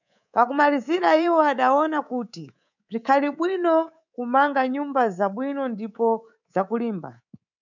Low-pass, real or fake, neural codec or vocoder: 7.2 kHz; fake; codec, 24 kHz, 3.1 kbps, DualCodec